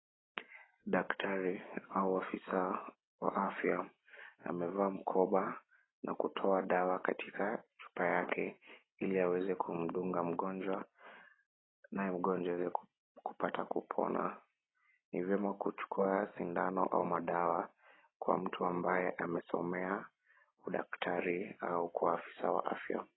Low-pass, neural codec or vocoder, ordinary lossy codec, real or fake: 7.2 kHz; none; AAC, 16 kbps; real